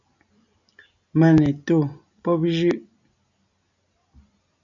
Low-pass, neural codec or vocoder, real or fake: 7.2 kHz; none; real